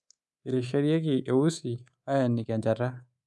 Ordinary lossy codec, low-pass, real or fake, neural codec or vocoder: none; none; fake; codec, 24 kHz, 3.1 kbps, DualCodec